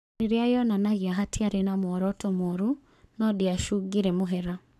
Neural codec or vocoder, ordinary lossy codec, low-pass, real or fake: codec, 44.1 kHz, 7.8 kbps, Pupu-Codec; none; 14.4 kHz; fake